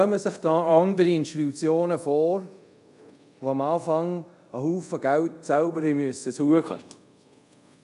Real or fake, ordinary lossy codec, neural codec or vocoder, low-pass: fake; none; codec, 24 kHz, 0.5 kbps, DualCodec; 10.8 kHz